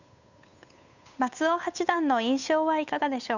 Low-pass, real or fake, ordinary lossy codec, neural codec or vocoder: 7.2 kHz; fake; none; codec, 16 kHz, 8 kbps, FunCodec, trained on Chinese and English, 25 frames a second